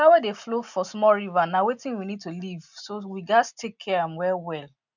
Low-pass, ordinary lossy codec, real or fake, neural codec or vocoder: 7.2 kHz; none; real; none